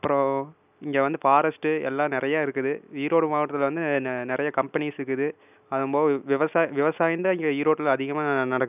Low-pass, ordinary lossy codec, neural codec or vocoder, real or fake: 3.6 kHz; none; none; real